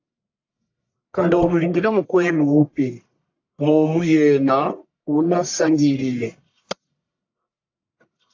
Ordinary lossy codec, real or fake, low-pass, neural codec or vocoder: AAC, 48 kbps; fake; 7.2 kHz; codec, 44.1 kHz, 1.7 kbps, Pupu-Codec